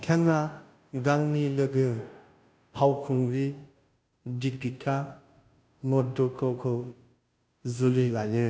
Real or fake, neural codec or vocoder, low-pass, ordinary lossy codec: fake; codec, 16 kHz, 0.5 kbps, FunCodec, trained on Chinese and English, 25 frames a second; none; none